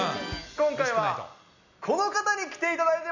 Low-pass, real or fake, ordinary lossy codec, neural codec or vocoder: 7.2 kHz; real; none; none